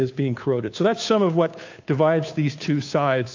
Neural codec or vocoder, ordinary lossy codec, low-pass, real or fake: codec, 16 kHz, 2 kbps, FunCodec, trained on Chinese and English, 25 frames a second; AAC, 48 kbps; 7.2 kHz; fake